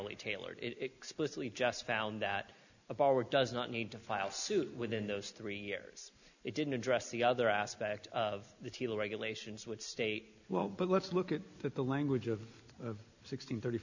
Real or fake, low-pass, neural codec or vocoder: real; 7.2 kHz; none